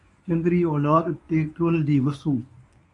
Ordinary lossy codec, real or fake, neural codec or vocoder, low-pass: AAC, 64 kbps; fake; codec, 24 kHz, 0.9 kbps, WavTokenizer, medium speech release version 1; 10.8 kHz